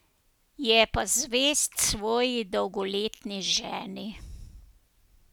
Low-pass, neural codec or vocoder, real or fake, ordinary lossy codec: none; none; real; none